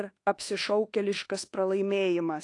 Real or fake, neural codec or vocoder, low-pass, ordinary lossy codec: fake; codec, 24 kHz, 1.2 kbps, DualCodec; 10.8 kHz; AAC, 48 kbps